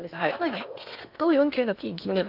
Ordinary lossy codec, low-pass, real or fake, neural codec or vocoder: none; 5.4 kHz; fake; codec, 16 kHz in and 24 kHz out, 0.8 kbps, FocalCodec, streaming, 65536 codes